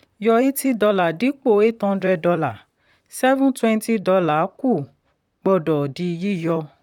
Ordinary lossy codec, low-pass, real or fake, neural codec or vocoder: none; 19.8 kHz; fake; vocoder, 44.1 kHz, 128 mel bands, Pupu-Vocoder